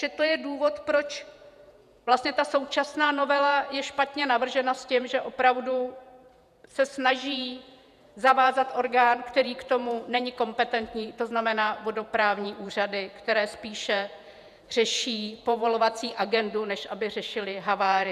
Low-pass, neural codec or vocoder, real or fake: 14.4 kHz; vocoder, 48 kHz, 128 mel bands, Vocos; fake